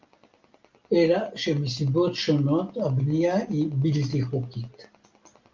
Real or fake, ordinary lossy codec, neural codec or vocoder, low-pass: real; Opus, 24 kbps; none; 7.2 kHz